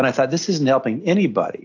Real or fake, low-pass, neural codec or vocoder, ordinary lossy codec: real; 7.2 kHz; none; AAC, 48 kbps